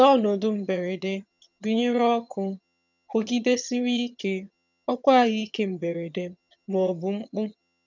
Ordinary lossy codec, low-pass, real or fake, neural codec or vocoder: none; 7.2 kHz; fake; vocoder, 22.05 kHz, 80 mel bands, HiFi-GAN